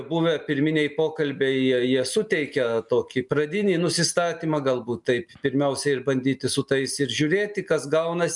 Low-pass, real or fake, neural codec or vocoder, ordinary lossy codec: 10.8 kHz; real; none; MP3, 96 kbps